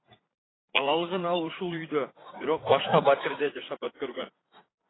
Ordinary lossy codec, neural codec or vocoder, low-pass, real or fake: AAC, 16 kbps; vocoder, 44.1 kHz, 80 mel bands, Vocos; 7.2 kHz; fake